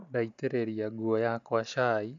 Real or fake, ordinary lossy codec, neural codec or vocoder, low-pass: real; none; none; 7.2 kHz